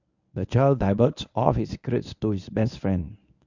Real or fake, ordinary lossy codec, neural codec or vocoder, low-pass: fake; none; codec, 24 kHz, 0.9 kbps, WavTokenizer, medium speech release version 1; 7.2 kHz